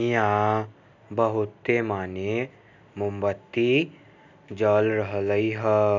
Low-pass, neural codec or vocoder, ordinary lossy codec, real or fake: 7.2 kHz; none; none; real